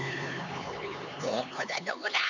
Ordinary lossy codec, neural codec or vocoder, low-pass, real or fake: none; codec, 16 kHz, 4 kbps, X-Codec, HuBERT features, trained on LibriSpeech; 7.2 kHz; fake